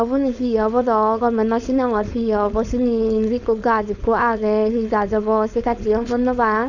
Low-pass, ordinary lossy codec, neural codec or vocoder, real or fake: 7.2 kHz; Opus, 64 kbps; codec, 16 kHz, 4.8 kbps, FACodec; fake